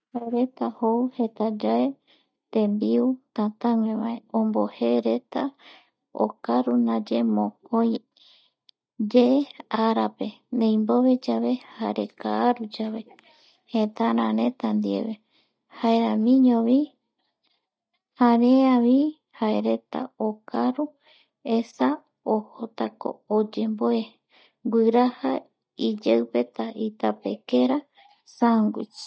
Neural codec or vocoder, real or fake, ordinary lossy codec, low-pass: none; real; none; none